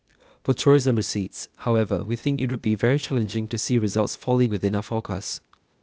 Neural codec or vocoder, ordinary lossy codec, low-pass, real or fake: codec, 16 kHz, 0.8 kbps, ZipCodec; none; none; fake